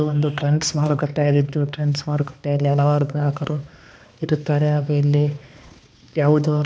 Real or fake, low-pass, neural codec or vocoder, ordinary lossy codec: fake; none; codec, 16 kHz, 2 kbps, X-Codec, HuBERT features, trained on balanced general audio; none